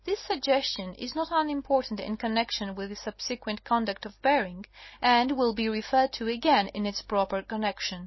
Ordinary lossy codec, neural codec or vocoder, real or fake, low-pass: MP3, 24 kbps; none; real; 7.2 kHz